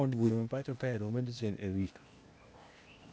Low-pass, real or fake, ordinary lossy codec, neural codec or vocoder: none; fake; none; codec, 16 kHz, 0.8 kbps, ZipCodec